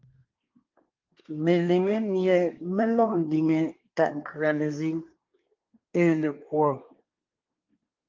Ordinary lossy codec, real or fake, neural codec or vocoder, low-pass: Opus, 24 kbps; fake; codec, 24 kHz, 1 kbps, SNAC; 7.2 kHz